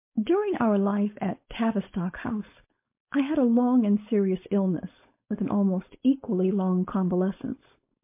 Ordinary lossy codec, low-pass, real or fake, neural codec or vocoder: MP3, 24 kbps; 3.6 kHz; fake; codec, 16 kHz, 4.8 kbps, FACodec